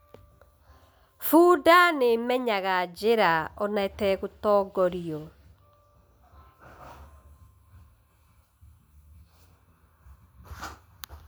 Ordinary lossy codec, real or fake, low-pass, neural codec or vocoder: none; real; none; none